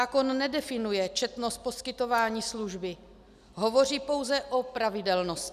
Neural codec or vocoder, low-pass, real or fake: none; 14.4 kHz; real